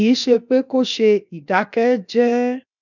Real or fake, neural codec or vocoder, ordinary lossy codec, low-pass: fake; codec, 16 kHz, 0.7 kbps, FocalCodec; none; 7.2 kHz